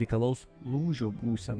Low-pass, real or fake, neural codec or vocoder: 9.9 kHz; fake; codec, 32 kHz, 1.9 kbps, SNAC